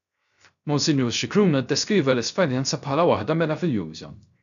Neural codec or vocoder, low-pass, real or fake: codec, 16 kHz, 0.3 kbps, FocalCodec; 7.2 kHz; fake